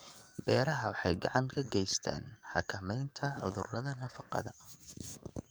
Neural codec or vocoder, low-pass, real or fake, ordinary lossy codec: codec, 44.1 kHz, 7.8 kbps, DAC; none; fake; none